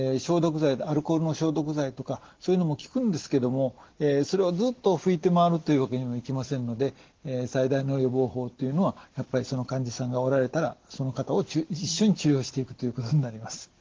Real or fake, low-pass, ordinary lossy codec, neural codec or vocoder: real; 7.2 kHz; Opus, 16 kbps; none